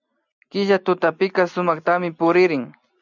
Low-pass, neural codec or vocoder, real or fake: 7.2 kHz; none; real